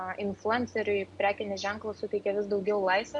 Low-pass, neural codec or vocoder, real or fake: 10.8 kHz; none; real